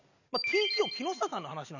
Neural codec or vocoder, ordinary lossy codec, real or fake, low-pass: none; none; real; 7.2 kHz